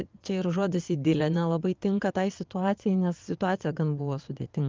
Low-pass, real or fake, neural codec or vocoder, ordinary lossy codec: 7.2 kHz; fake; vocoder, 22.05 kHz, 80 mel bands, Vocos; Opus, 32 kbps